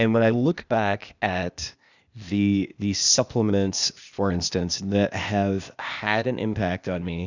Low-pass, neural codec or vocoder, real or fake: 7.2 kHz; codec, 16 kHz, 0.8 kbps, ZipCodec; fake